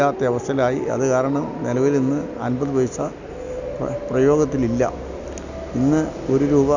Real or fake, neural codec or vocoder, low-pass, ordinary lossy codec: real; none; 7.2 kHz; none